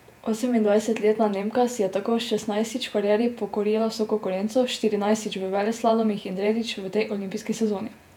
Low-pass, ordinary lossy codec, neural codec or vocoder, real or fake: 19.8 kHz; none; vocoder, 48 kHz, 128 mel bands, Vocos; fake